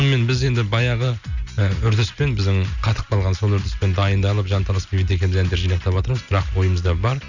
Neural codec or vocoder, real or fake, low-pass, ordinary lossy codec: none; real; 7.2 kHz; none